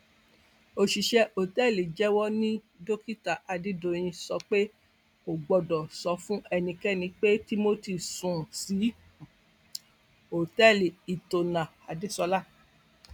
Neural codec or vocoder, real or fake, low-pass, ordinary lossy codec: none; real; 19.8 kHz; none